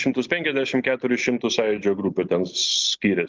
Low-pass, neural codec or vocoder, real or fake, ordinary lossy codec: 7.2 kHz; none; real; Opus, 32 kbps